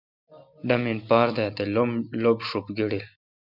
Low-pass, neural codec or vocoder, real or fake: 5.4 kHz; none; real